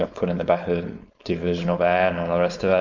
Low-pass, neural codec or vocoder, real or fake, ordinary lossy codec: 7.2 kHz; codec, 16 kHz, 4.8 kbps, FACodec; fake; MP3, 64 kbps